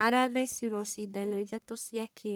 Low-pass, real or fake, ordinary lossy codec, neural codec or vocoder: none; fake; none; codec, 44.1 kHz, 1.7 kbps, Pupu-Codec